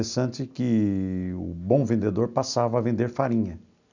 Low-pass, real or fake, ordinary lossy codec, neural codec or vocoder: 7.2 kHz; real; none; none